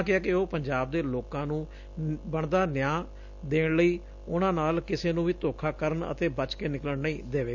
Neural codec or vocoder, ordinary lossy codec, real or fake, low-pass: none; none; real; 7.2 kHz